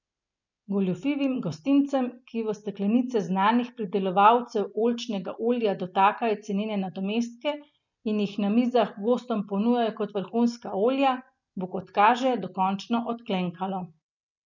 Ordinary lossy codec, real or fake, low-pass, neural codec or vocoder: none; real; 7.2 kHz; none